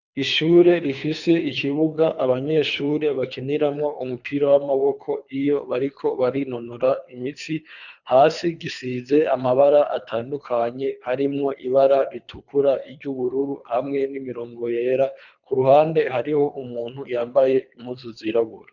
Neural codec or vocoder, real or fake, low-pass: codec, 24 kHz, 3 kbps, HILCodec; fake; 7.2 kHz